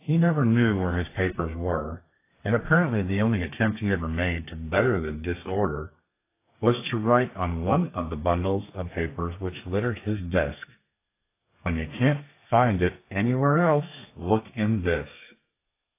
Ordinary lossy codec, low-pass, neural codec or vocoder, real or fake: AAC, 24 kbps; 3.6 kHz; codec, 32 kHz, 1.9 kbps, SNAC; fake